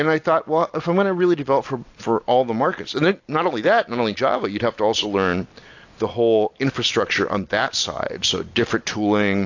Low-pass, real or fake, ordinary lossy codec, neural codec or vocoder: 7.2 kHz; real; AAC, 48 kbps; none